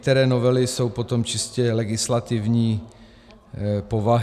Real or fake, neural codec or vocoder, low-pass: real; none; 14.4 kHz